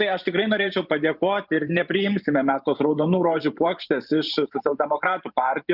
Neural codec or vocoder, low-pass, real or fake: vocoder, 44.1 kHz, 128 mel bands every 512 samples, BigVGAN v2; 5.4 kHz; fake